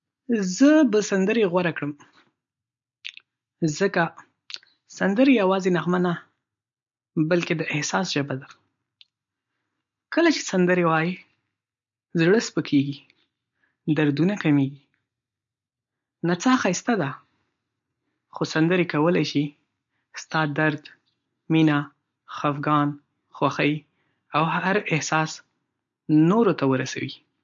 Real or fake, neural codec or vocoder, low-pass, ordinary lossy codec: real; none; 7.2 kHz; MP3, 48 kbps